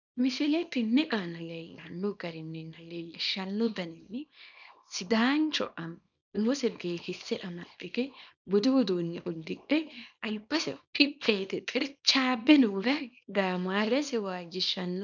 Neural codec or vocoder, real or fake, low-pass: codec, 24 kHz, 0.9 kbps, WavTokenizer, small release; fake; 7.2 kHz